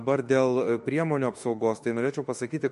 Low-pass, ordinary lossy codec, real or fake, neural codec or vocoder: 14.4 kHz; MP3, 48 kbps; fake; autoencoder, 48 kHz, 32 numbers a frame, DAC-VAE, trained on Japanese speech